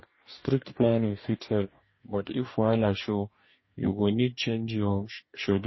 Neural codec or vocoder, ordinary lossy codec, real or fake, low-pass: codec, 44.1 kHz, 2.6 kbps, DAC; MP3, 24 kbps; fake; 7.2 kHz